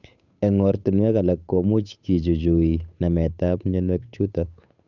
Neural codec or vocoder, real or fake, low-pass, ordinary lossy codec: codec, 16 kHz, 8 kbps, FunCodec, trained on Chinese and English, 25 frames a second; fake; 7.2 kHz; none